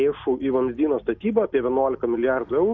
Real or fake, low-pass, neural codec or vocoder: real; 7.2 kHz; none